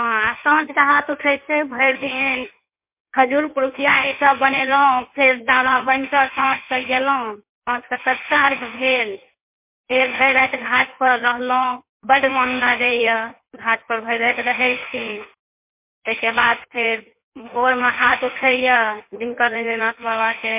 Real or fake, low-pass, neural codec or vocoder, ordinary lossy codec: fake; 3.6 kHz; codec, 16 kHz in and 24 kHz out, 1.1 kbps, FireRedTTS-2 codec; MP3, 32 kbps